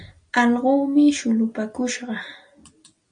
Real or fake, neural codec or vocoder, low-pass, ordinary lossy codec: real; none; 9.9 kHz; MP3, 64 kbps